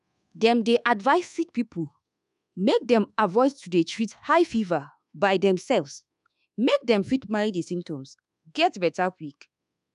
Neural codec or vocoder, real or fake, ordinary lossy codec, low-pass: codec, 24 kHz, 1.2 kbps, DualCodec; fake; none; 10.8 kHz